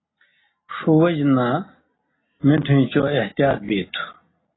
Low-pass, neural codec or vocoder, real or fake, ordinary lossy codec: 7.2 kHz; none; real; AAC, 16 kbps